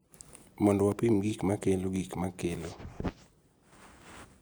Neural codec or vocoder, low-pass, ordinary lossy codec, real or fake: none; none; none; real